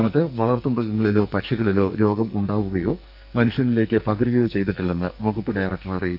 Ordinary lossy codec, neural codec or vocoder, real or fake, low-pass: none; codec, 44.1 kHz, 2.6 kbps, SNAC; fake; 5.4 kHz